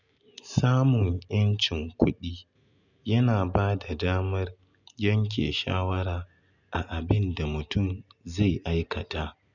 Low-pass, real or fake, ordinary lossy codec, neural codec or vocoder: 7.2 kHz; real; none; none